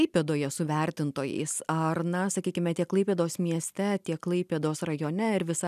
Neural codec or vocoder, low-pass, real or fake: none; 14.4 kHz; real